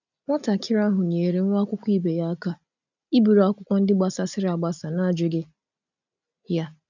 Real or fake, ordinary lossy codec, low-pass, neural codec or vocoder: real; none; 7.2 kHz; none